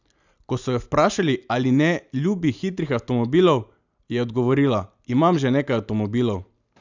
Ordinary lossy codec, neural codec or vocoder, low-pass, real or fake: none; none; 7.2 kHz; real